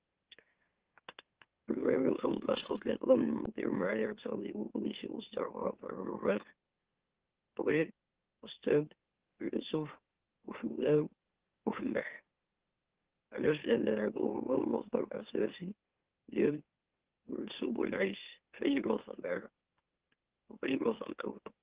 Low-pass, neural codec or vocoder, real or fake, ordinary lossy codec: 3.6 kHz; autoencoder, 44.1 kHz, a latent of 192 numbers a frame, MeloTTS; fake; Opus, 16 kbps